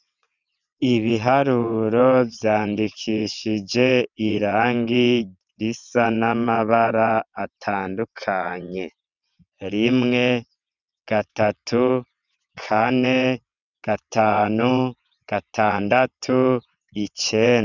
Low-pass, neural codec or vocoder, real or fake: 7.2 kHz; vocoder, 22.05 kHz, 80 mel bands, WaveNeXt; fake